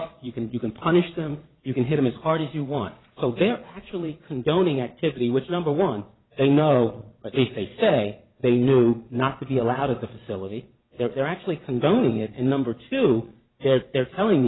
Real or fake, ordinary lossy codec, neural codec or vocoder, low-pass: fake; AAC, 16 kbps; vocoder, 22.05 kHz, 80 mel bands, Vocos; 7.2 kHz